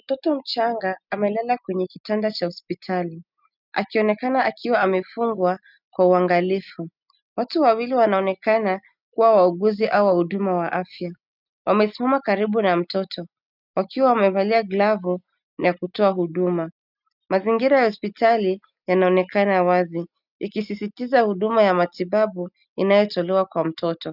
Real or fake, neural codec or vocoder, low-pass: real; none; 5.4 kHz